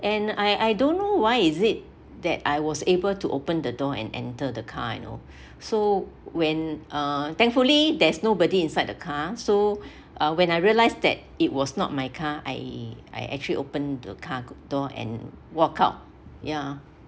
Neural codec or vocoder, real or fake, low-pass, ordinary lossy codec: none; real; none; none